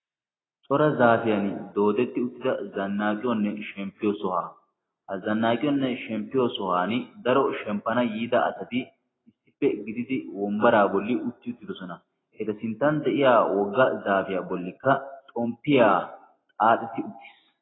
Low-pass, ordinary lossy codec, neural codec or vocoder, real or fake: 7.2 kHz; AAC, 16 kbps; none; real